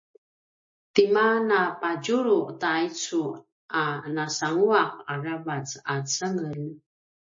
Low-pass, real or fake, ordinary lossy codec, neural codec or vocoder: 7.2 kHz; real; MP3, 32 kbps; none